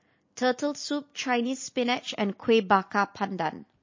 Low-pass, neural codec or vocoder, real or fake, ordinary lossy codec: 7.2 kHz; none; real; MP3, 32 kbps